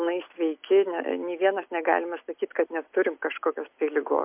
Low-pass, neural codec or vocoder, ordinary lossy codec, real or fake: 3.6 kHz; none; MP3, 32 kbps; real